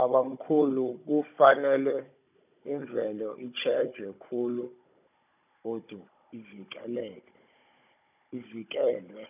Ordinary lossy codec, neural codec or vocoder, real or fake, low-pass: none; codec, 16 kHz, 16 kbps, FunCodec, trained on Chinese and English, 50 frames a second; fake; 3.6 kHz